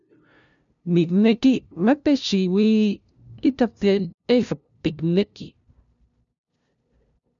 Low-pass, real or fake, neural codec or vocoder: 7.2 kHz; fake; codec, 16 kHz, 0.5 kbps, FunCodec, trained on LibriTTS, 25 frames a second